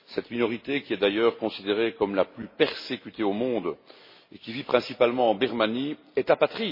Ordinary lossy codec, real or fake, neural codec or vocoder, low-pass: MP3, 24 kbps; real; none; 5.4 kHz